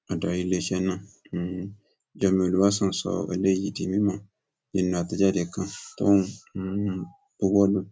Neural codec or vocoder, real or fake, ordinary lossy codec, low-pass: none; real; none; none